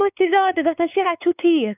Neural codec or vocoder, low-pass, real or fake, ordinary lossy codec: codec, 16 kHz, 4 kbps, X-Codec, WavLM features, trained on Multilingual LibriSpeech; 3.6 kHz; fake; none